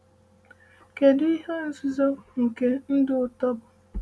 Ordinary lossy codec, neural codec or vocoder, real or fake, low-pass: none; none; real; none